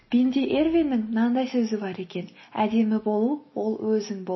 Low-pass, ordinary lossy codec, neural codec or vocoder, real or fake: 7.2 kHz; MP3, 24 kbps; none; real